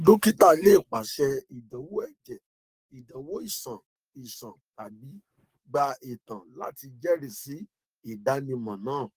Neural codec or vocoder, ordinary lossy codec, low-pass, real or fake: codec, 44.1 kHz, 7.8 kbps, DAC; Opus, 24 kbps; 14.4 kHz; fake